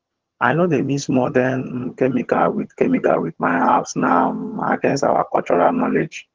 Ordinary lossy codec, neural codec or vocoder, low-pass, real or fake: Opus, 16 kbps; vocoder, 22.05 kHz, 80 mel bands, HiFi-GAN; 7.2 kHz; fake